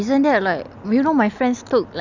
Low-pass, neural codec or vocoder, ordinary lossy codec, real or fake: 7.2 kHz; none; none; real